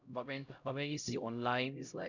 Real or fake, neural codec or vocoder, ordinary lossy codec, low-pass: fake; codec, 16 kHz, 0.5 kbps, X-Codec, HuBERT features, trained on LibriSpeech; none; 7.2 kHz